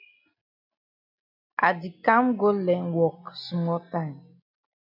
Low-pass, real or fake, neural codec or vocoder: 5.4 kHz; real; none